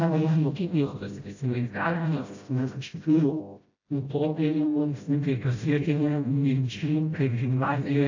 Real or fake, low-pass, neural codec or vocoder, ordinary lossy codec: fake; 7.2 kHz; codec, 16 kHz, 0.5 kbps, FreqCodec, smaller model; AAC, 48 kbps